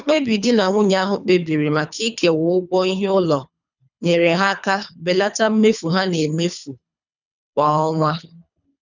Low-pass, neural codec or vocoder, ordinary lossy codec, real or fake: 7.2 kHz; codec, 24 kHz, 3 kbps, HILCodec; none; fake